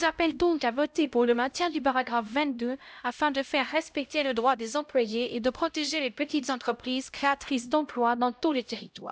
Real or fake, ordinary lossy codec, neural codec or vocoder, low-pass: fake; none; codec, 16 kHz, 0.5 kbps, X-Codec, HuBERT features, trained on LibriSpeech; none